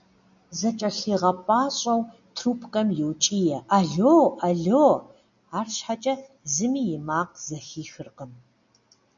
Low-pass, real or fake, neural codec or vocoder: 7.2 kHz; real; none